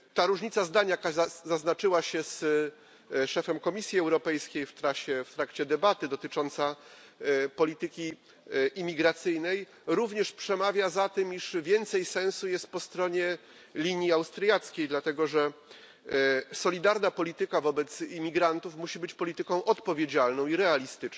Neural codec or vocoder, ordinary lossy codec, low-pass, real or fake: none; none; none; real